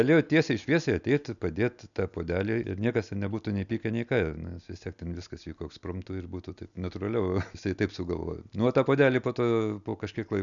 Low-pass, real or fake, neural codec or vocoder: 7.2 kHz; real; none